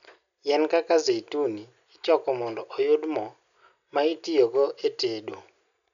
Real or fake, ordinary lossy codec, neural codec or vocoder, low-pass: real; none; none; 7.2 kHz